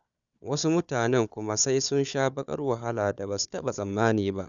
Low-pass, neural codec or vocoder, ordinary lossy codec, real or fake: 7.2 kHz; codec, 16 kHz, 4 kbps, FunCodec, trained on Chinese and English, 50 frames a second; none; fake